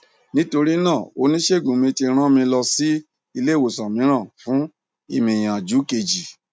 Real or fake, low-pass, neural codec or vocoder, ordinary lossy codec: real; none; none; none